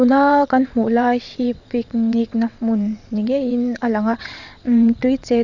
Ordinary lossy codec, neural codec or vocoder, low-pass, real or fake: none; vocoder, 22.05 kHz, 80 mel bands, WaveNeXt; 7.2 kHz; fake